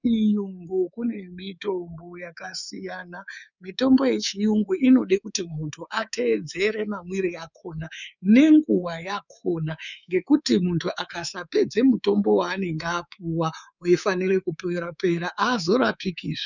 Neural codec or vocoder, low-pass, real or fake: codec, 24 kHz, 3.1 kbps, DualCodec; 7.2 kHz; fake